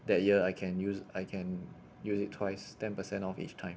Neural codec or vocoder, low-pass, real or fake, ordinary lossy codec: none; none; real; none